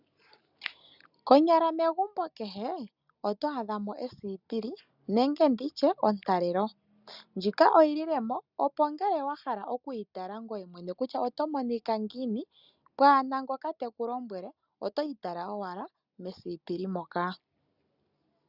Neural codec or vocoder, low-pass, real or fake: none; 5.4 kHz; real